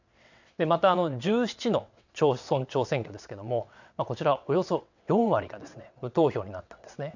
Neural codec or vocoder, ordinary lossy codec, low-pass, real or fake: vocoder, 22.05 kHz, 80 mel bands, WaveNeXt; none; 7.2 kHz; fake